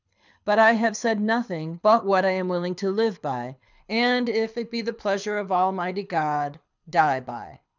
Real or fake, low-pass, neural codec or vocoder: fake; 7.2 kHz; codec, 24 kHz, 6 kbps, HILCodec